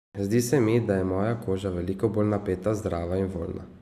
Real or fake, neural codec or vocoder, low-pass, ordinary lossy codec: real; none; 14.4 kHz; none